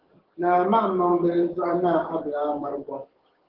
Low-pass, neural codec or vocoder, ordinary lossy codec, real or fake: 5.4 kHz; codec, 44.1 kHz, 7.8 kbps, DAC; Opus, 16 kbps; fake